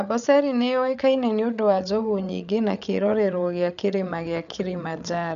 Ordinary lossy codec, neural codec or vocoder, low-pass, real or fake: none; codec, 16 kHz, 8 kbps, FreqCodec, larger model; 7.2 kHz; fake